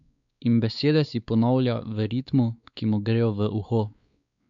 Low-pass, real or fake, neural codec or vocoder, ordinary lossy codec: 7.2 kHz; fake; codec, 16 kHz, 4 kbps, X-Codec, WavLM features, trained on Multilingual LibriSpeech; none